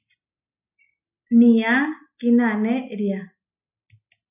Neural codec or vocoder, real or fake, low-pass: none; real; 3.6 kHz